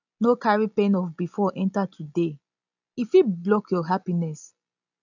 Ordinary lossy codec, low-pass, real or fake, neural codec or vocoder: none; 7.2 kHz; real; none